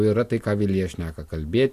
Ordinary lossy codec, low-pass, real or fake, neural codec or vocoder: AAC, 64 kbps; 14.4 kHz; real; none